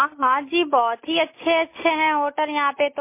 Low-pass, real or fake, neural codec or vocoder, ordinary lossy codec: 3.6 kHz; real; none; MP3, 24 kbps